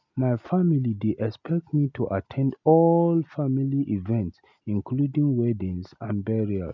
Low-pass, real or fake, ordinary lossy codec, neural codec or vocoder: 7.2 kHz; real; none; none